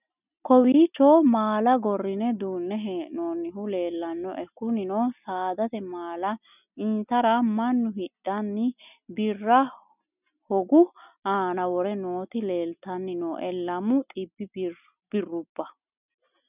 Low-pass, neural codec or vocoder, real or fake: 3.6 kHz; none; real